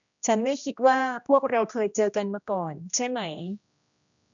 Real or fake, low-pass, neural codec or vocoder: fake; 7.2 kHz; codec, 16 kHz, 1 kbps, X-Codec, HuBERT features, trained on general audio